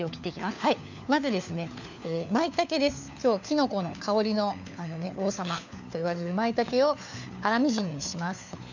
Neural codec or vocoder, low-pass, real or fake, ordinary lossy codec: codec, 16 kHz, 4 kbps, FunCodec, trained on LibriTTS, 50 frames a second; 7.2 kHz; fake; none